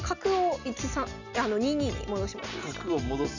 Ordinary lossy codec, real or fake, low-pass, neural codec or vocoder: none; real; 7.2 kHz; none